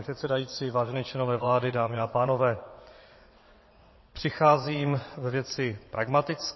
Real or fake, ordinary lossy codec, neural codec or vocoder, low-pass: fake; MP3, 24 kbps; vocoder, 22.05 kHz, 80 mel bands, WaveNeXt; 7.2 kHz